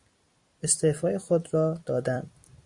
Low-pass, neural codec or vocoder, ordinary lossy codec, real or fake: 10.8 kHz; vocoder, 44.1 kHz, 128 mel bands every 256 samples, BigVGAN v2; Opus, 64 kbps; fake